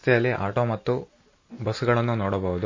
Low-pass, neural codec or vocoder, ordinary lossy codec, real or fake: 7.2 kHz; none; MP3, 32 kbps; real